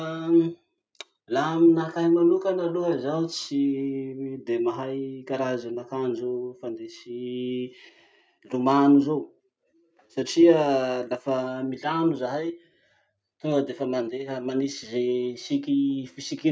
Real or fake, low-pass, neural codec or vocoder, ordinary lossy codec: real; none; none; none